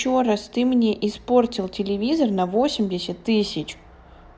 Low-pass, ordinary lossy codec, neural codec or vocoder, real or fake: none; none; none; real